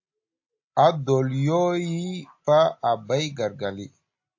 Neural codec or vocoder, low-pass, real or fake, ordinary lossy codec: none; 7.2 kHz; real; AAC, 48 kbps